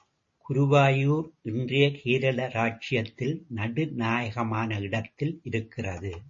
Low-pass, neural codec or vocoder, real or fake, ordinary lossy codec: 7.2 kHz; none; real; MP3, 32 kbps